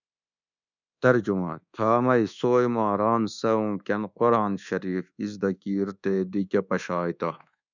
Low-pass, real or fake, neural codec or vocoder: 7.2 kHz; fake; codec, 24 kHz, 1.2 kbps, DualCodec